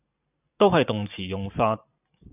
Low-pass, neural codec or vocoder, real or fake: 3.6 kHz; vocoder, 44.1 kHz, 128 mel bands every 512 samples, BigVGAN v2; fake